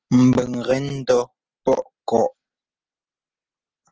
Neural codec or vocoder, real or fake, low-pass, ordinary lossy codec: none; real; 7.2 kHz; Opus, 16 kbps